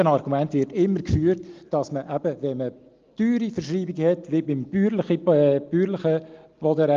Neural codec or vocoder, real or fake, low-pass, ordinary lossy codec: none; real; 7.2 kHz; Opus, 32 kbps